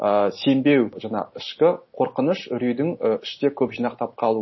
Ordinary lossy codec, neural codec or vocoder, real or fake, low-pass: MP3, 24 kbps; none; real; 7.2 kHz